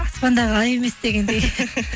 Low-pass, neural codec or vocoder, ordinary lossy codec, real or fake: none; none; none; real